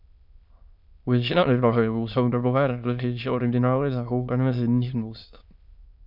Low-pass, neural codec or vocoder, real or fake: 5.4 kHz; autoencoder, 22.05 kHz, a latent of 192 numbers a frame, VITS, trained on many speakers; fake